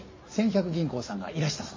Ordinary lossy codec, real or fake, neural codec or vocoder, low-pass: MP3, 32 kbps; real; none; 7.2 kHz